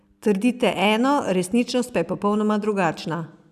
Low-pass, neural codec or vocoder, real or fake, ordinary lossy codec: 14.4 kHz; none; real; none